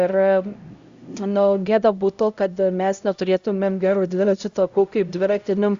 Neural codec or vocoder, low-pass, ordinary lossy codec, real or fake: codec, 16 kHz, 0.5 kbps, X-Codec, HuBERT features, trained on LibriSpeech; 7.2 kHz; Opus, 64 kbps; fake